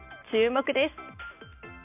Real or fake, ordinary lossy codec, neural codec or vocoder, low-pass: real; none; none; 3.6 kHz